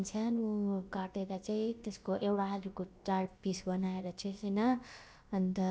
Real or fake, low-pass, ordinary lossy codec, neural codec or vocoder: fake; none; none; codec, 16 kHz, about 1 kbps, DyCAST, with the encoder's durations